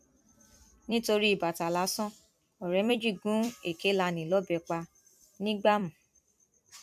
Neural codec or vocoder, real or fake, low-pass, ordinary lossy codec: vocoder, 44.1 kHz, 128 mel bands every 256 samples, BigVGAN v2; fake; 14.4 kHz; none